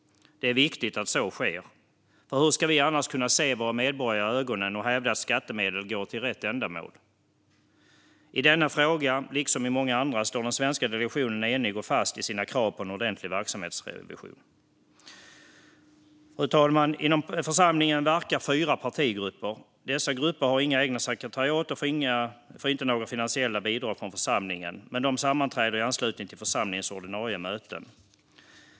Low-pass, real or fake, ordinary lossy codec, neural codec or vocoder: none; real; none; none